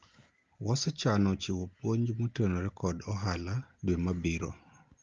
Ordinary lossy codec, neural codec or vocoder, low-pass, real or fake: Opus, 32 kbps; none; 7.2 kHz; real